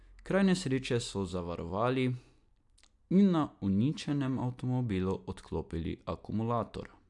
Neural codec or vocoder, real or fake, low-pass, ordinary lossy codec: none; real; 10.8 kHz; AAC, 64 kbps